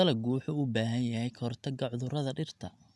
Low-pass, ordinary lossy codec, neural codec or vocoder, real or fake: none; none; none; real